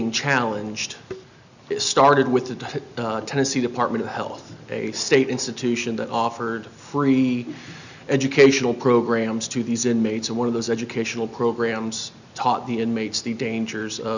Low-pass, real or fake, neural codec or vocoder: 7.2 kHz; real; none